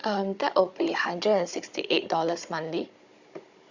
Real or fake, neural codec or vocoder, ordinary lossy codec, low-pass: fake; codec, 16 kHz, 16 kbps, FunCodec, trained on Chinese and English, 50 frames a second; Opus, 64 kbps; 7.2 kHz